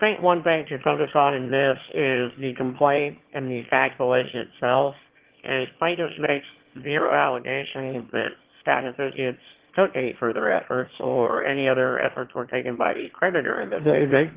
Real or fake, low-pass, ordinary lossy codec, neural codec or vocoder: fake; 3.6 kHz; Opus, 16 kbps; autoencoder, 22.05 kHz, a latent of 192 numbers a frame, VITS, trained on one speaker